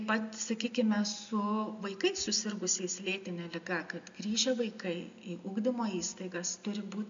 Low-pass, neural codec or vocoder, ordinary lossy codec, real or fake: 7.2 kHz; none; MP3, 64 kbps; real